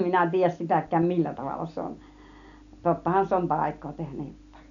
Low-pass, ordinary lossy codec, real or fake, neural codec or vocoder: 7.2 kHz; none; real; none